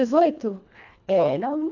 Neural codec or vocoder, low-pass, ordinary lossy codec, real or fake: codec, 24 kHz, 1.5 kbps, HILCodec; 7.2 kHz; none; fake